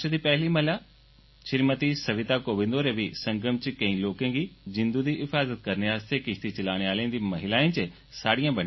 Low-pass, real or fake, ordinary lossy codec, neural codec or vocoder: 7.2 kHz; real; MP3, 24 kbps; none